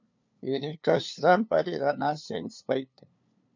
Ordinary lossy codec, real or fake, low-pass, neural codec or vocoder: MP3, 64 kbps; fake; 7.2 kHz; codec, 16 kHz, 2 kbps, FunCodec, trained on LibriTTS, 25 frames a second